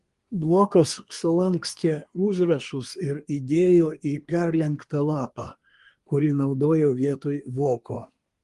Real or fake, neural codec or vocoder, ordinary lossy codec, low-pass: fake; codec, 24 kHz, 1 kbps, SNAC; Opus, 24 kbps; 10.8 kHz